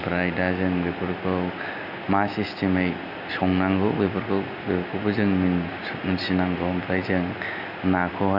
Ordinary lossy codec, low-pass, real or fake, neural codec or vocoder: none; 5.4 kHz; real; none